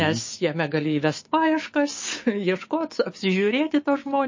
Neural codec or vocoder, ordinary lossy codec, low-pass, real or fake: codec, 16 kHz, 6 kbps, DAC; MP3, 32 kbps; 7.2 kHz; fake